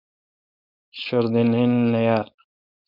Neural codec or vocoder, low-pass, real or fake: codec, 16 kHz, 4.8 kbps, FACodec; 5.4 kHz; fake